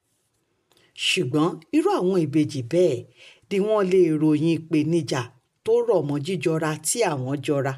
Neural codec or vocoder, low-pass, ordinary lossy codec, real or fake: none; 14.4 kHz; none; real